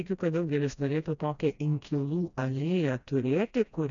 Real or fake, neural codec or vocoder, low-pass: fake; codec, 16 kHz, 1 kbps, FreqCodec, smaller model; 7.2 kHz